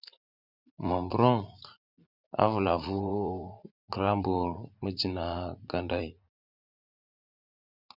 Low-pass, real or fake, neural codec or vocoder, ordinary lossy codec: 5.4 kHz; fake; vocoder, 44.1 kHz, 80 mel bands, Vocos; Opus, 64 kbps